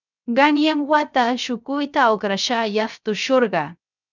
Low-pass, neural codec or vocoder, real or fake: 7.2 kHz; codec, 16 kHz, 0.3 kbps, FocalCodec; fake